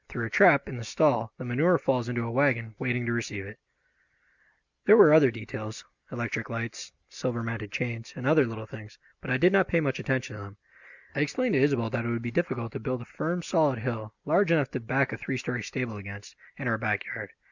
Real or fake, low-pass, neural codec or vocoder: real; 7.2 kHz; none